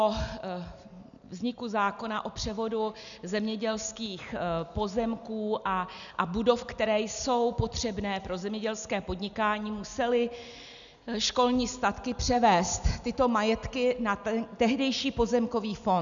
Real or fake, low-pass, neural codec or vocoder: real; 7.2 kHz; none